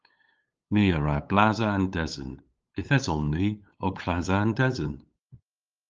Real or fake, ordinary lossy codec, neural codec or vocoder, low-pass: fake; Opus, 24 kbps; codec, 16 kHz, 8 kbps, FunCodec, trained on LibriTTS, 25 frames a second; 7.2 kHz